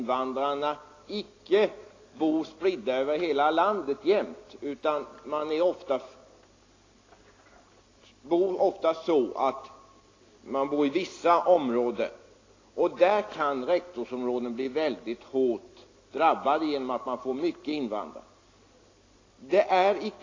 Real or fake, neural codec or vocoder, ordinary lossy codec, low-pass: real; none; AAC, 32 kbps; 7.2 kHz